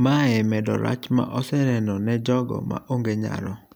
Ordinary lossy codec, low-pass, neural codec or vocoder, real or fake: none; none; none; real